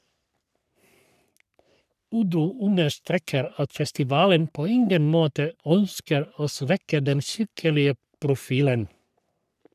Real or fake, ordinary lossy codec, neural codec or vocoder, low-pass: fake; none; codec, 44.1 kHz, 3.4 kbps, Pupu-Codec; 14.4 kHz